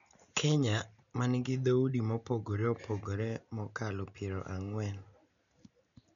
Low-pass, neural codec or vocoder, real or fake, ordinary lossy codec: 7.2 kHz; none; real; none